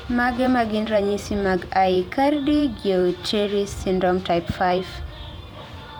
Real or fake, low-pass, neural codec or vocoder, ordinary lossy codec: fake; none; vocoder, 44.1 kHz, 128 mel bands every 512 samples, BigVGAN v2; none